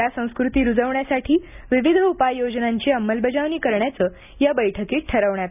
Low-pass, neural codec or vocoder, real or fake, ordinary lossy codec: 3.6 kHz; none; real; none